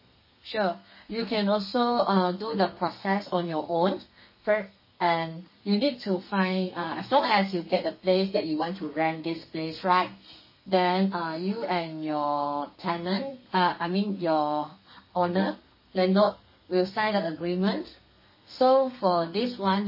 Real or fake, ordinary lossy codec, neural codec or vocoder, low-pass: fake; MP3, 24 kbps; codec, 32 kHz, 1.9 kbps, SNAC; 5.4 kHz